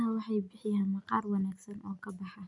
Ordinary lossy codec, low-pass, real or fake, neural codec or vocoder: none; 10.8 kHz; real; none